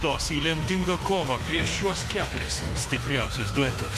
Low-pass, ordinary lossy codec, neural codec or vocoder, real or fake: 14.4 kHz; AAC, 64 kbps; autoencoder, 48 kHz, 32 numbers a frame, DAC-VAE, trained on Japanese speech; fake